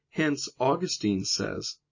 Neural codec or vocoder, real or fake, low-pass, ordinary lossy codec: none; real; 7.2 kHz; MP3, 32 kbps